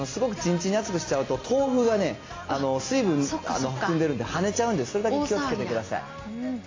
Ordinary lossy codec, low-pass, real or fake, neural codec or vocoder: AAC, 32 kbps; 7.2 kHz; real; none